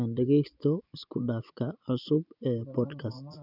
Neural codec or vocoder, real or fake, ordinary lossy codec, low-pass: vocoder, 24 kHz, 100 mel bands, Vocos; fake; none; 5.4 kHz